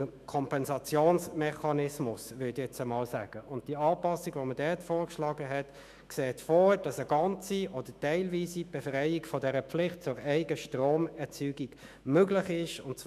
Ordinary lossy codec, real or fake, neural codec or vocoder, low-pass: none; fake; autoencoder, 48 kHz, 128 numbers a frame, DAC-VAE, trained on Japanese speech; 14.4 kHz